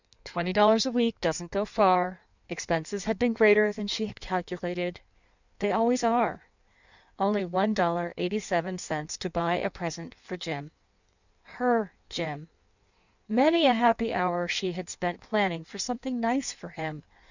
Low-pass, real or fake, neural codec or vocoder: 7.2 kHz; fake; codec, 16 kHz in and 24 kHz out, 1.1 kbps, FireRedTTS-2 codec